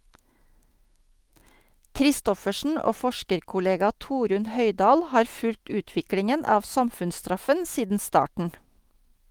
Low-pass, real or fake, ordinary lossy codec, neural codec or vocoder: 19.8 kHz; fake; Opus, 24 kbps; autoencoder, 48 kHz, 128 numbers a frame, DAC-VAE, trained on Japanese speech